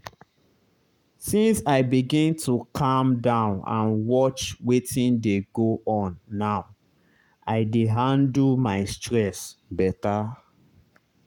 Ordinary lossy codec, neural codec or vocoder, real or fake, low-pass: none; codec, 44.1 kHz, 7.8 kbps, Pupu-Codec; fake; 19.8 kHz